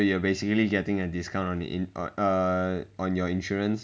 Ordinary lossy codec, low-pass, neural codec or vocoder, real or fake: none; none; none; real